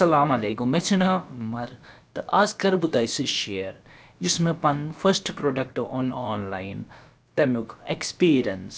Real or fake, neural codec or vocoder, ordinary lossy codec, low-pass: fake; codec, 16 kHz, about 1 kbps, DyCAST, with the encoder's durations; none; none